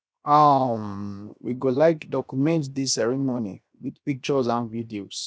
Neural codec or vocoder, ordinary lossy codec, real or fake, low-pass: codec, 16 kHz, 0.7 kbps, FocalCodec; none; fake; none